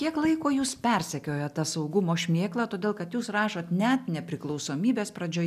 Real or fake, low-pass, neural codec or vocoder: real; 14.4 kHz; none